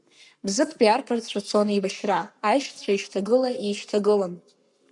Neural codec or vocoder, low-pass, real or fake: codec, 44.1 kHz, 7.8 kbps, Pupu-Codec; 10.8 kHz; fake